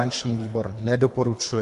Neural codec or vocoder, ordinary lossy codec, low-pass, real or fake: codec, 24 kHz, 3 kbps, HILCodec; AAC, 64 kbps; 10.8 kHz; fake